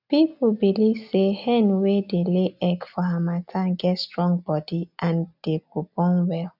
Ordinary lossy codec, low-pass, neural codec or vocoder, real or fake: none; 5.4 kHz; none; real